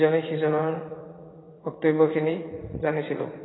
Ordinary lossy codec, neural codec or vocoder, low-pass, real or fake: AAC, 16 kbps; vocoder, 44.1 kHz, 80 mel bands, Vocos; 7.2 kHz; fake